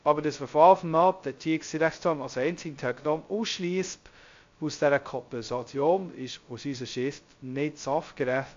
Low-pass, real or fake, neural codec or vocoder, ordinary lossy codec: 7.2 kHz; fake; codec, 16 kHz, 0.2 kbps, FocalCodec; AAC, 48 kbps